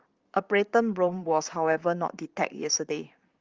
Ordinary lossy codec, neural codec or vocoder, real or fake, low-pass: Opus, 32 kbps; vocoder, 44.1 kHz, 128 mel bands, Pupu-Vocoder; fake; 7.2 kHz